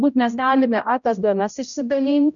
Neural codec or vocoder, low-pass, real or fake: codec, 16 kHz, 0.5 kbps, X-Codec, HuBERT features, trained on general audio; 7.2 kHz; fake